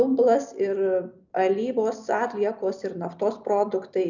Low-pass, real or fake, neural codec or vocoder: 7.2 kHz; real; none